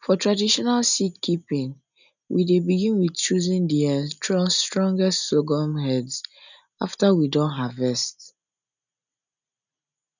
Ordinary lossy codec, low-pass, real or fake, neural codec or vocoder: none; 7.2 kHz; real; none